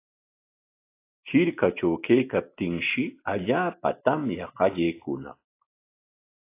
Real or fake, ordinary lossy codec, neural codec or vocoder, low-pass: real; AAC, 24 kbps; none; 3.6 kHz